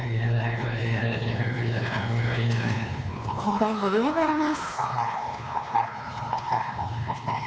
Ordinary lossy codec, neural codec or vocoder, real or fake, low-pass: none; codec, 16 kHz, 2 kbps, X-Codec, WavLM features, trained on Multilingual LibriSpeech; fake; none